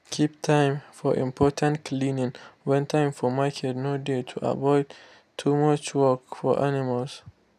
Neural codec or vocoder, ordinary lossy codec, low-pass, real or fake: none; none; 14.4 kHz; real